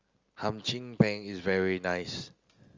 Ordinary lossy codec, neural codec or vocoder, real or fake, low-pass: Opus, 32 kbps; none; real; 7.2 kHz